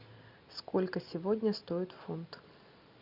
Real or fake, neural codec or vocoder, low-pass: real; none; 5.4 kHz